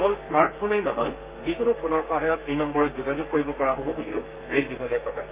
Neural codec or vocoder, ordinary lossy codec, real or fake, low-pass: codec, 32 kHz, 1.9 kbps, SNAC; Opus, 24 kbps; fake; 3.6 kHz